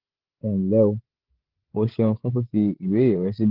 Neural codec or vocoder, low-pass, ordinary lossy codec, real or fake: codec, 16 kHz, 16 kbps, FreqCodec, larger model; 5.4 kHz; Opus, 16 kbps; fake